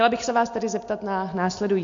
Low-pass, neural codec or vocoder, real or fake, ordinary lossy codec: 7.2 kHz; none; real; MP3, 48 kbps